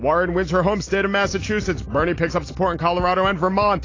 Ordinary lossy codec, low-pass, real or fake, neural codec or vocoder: AAC, 32 kbps; 7.2 kHz; real; none